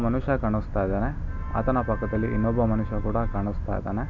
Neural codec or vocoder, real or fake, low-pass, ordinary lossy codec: none; real; 7.2 kHz; none